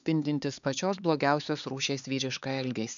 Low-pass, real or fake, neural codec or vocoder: 7.2 kHz; fake; codec, 16 kHz, 4 kbps, X-Codec, HuBERT features, trained on LibriSpeech